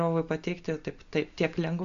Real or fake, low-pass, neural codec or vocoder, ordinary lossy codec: real; 7.2 kHz; none; MP3, 48 kbps